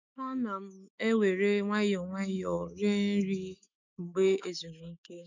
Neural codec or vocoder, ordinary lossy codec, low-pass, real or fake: autoencoder, 48 kHz, 128 numbers a frame, DAC-VAE, trained on Japanese speech; none; 7.2 kHz; fake